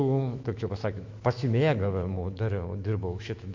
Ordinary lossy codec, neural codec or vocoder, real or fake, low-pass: MP3, 48 kbps; autoencoder, 48 kHz, 128 numbers a frame, DAC-VAE, trained on Japanese speech; fake; 7.2 kHz